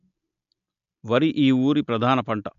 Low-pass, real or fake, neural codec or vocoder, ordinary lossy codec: 7.2 kHz; real; none; MP3, 64 kbps